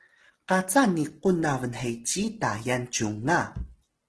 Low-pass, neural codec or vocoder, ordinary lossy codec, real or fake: 10.8 kHz; none; Opus, 16 kbps; real